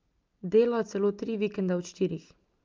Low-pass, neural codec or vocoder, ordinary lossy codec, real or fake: 7.2 kHz; none; Opus, 24 kbps; real